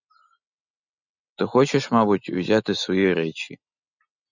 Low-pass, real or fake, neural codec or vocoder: 7.2 kHz; real; none